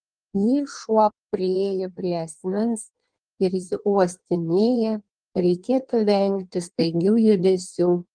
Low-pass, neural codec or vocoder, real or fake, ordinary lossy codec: 9.9 kHz; codec, 16 kHz in and 24 kHz out, 1.1 kbps, FireRedTTS-2 codec; fake; Opus, 24 kbps